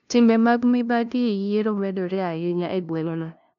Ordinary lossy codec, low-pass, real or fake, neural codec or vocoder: none; 7.2 kHz; fake; codec, 16 kHz, 0.5 kbps, FunCodec, trained on LibriTTS, 25 frames a second